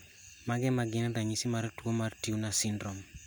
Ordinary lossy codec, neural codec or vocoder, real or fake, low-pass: none; none; real; none